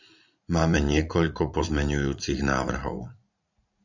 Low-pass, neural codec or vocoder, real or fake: 7.2 kHz; vocoder, 44.1 kHz, 80 mel bands, Vocos; fake